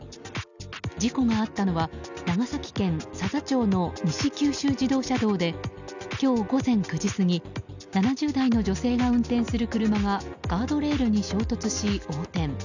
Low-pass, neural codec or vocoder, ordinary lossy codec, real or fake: 7.2 kHz; none; none; real